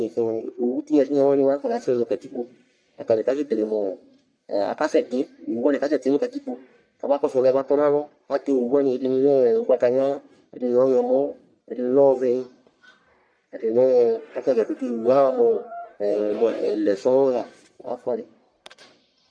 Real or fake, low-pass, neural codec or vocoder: fake; 9.9 kHz; codec, 44.1 kHz, 1.7 kbps, Pupu-Codec